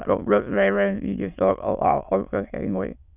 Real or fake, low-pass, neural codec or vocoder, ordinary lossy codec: fake; 3.6 kHz; autoencoder, 22.05 kHz, a latent of 192 numbers a frame, VITS, trained on many speakers; none